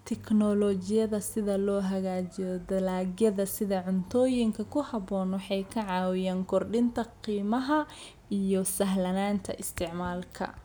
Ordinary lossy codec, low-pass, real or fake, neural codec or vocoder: none; none; real; none